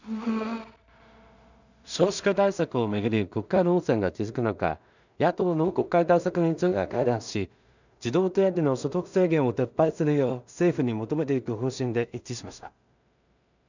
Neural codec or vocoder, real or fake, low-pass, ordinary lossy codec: codec, 16 kHz in and 24 kHz out, 0.4 kbps, LongCat-Audio-Codec, two codebook decoder; fake; 7.2 kHz; none